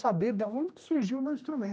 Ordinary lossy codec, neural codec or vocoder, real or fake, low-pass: none; codec, 16 kHz, 1 kbps, X-Codec, HuBERT features, trained on general audio; fake; none